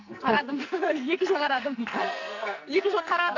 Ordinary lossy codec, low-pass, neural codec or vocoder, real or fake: AAC, 48 kbps; 7.2 kHz; codec, 44.1 kHz, 2.6 kbps, SNAC; fake